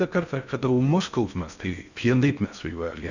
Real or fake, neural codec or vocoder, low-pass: fake; codec, 16 kHz in and 24 kHz out, 0.6 kbps, FocalCodec, streaming, 4096 codes; 7.2 kHz